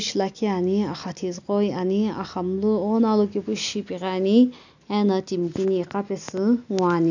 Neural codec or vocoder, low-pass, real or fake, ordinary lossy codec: none; 7.2 kHz; real; none